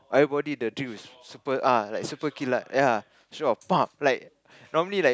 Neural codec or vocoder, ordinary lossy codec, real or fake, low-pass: none; none; real; none